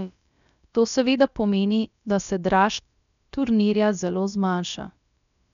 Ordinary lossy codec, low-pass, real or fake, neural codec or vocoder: none; 7.2 kHz; fake; codec, 16 kHz, about 1 kbps, DyCAST, with the encoder's durations